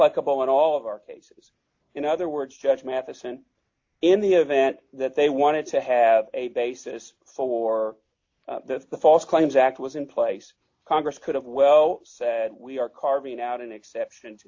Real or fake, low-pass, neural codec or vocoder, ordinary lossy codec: real; 7.2 kHz; none; AAC, 48 kbps